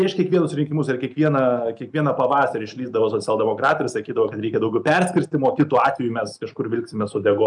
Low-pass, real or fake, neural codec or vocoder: 10.8 kHz; real; none